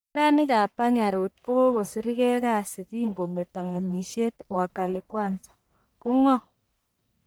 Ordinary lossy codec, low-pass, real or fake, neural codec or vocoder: none; none; fake; codec, 44.1 kHz, 1.7 kbps, Pupu-Codec